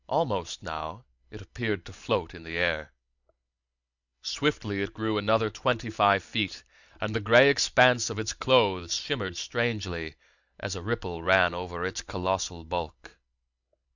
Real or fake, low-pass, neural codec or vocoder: real; 7.2 kHz; none